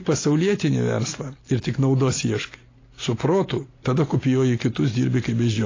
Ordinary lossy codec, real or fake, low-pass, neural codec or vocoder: AAC, 32 kbps; real; 7.2 kHz; none